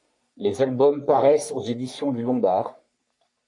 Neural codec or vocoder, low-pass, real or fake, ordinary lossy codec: codec, 44.1 kHz, 3.4 kbps, Pupu-Codec; 10.8 kHz; fake; MP3, 64 kbps